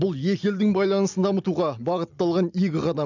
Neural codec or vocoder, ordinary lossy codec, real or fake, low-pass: none; none; real; 7.2 kHz